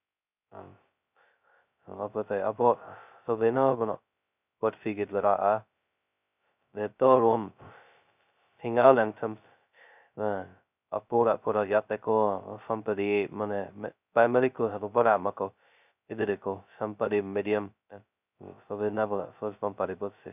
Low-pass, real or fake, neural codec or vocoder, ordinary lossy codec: 3.6 kHz; fake; codec, 16 kHz, 0.2 kbps, FocalCodec; none